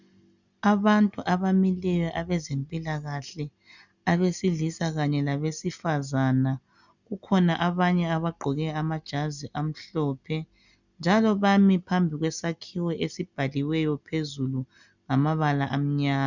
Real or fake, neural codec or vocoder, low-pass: real; none; 7.2 kHz